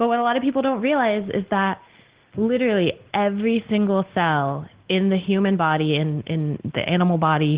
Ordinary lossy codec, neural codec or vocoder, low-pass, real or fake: Opus, 16 kbps; none; 3.6 kHz; real